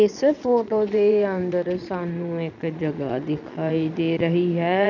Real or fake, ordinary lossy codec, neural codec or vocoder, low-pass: fake; none; vocoder, 22.05 kHz, 80 mel bands, Vocos; 7.2 kHz